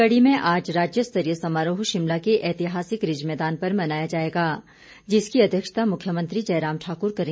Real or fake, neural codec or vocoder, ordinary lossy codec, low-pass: real; none; none; none